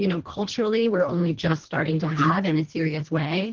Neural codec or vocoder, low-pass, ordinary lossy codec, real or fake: codec, 24 kHz, 1.5 kbps, HILCodec; 7.2 kHz; Opus, 16 kbps; fake